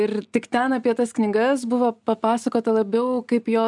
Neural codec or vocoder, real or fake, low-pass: none; real; 10.8 kHz